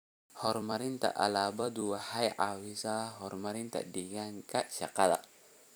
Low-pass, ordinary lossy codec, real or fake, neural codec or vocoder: none; none; fake; vocoder, 44.1 kHz, 128 mel bands every 256 samples, BigVGAN v2